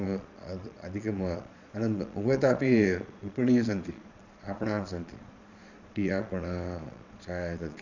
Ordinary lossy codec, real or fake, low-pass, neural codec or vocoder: none; fake; 7.2 kHz; vocoder, 22.05 kHz, 80 mel bands, Vocos